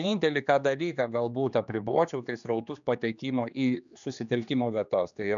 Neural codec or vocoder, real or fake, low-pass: codec, 16 kHz, 2 kbps, X-Codec, HuBERT features, trained on general audio; fake; 7.2 kHz